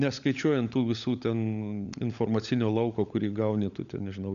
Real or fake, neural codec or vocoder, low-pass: fake; codec, 16 kHz, 16 kbps, FunCodec, trained on LibriTTS, 50 frames a second; 7.2 kHz